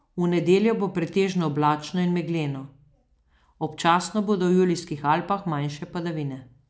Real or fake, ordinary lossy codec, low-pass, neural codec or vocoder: real; none; none; none